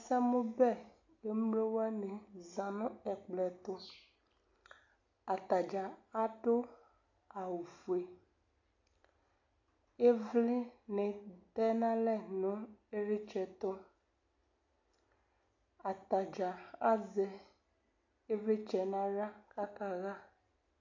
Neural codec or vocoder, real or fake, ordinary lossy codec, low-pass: none; real; AAC, 48 kbps; 7.2 kHz